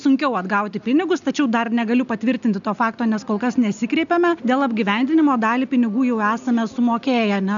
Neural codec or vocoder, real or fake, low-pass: none; real; 7.2 kHz